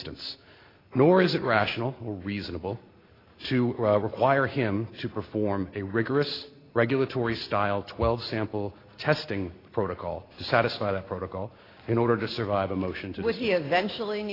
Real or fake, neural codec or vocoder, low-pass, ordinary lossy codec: real; none; 5.4 kHz; AAC, 24 kbps